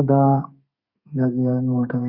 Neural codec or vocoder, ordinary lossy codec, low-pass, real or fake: codec, 32 kHz, 1.9 kbps, SNAC; Opus, 64 kbps; 5.4 kHz; fake